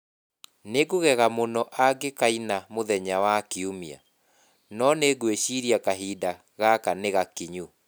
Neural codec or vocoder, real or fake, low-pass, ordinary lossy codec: none; real; none; none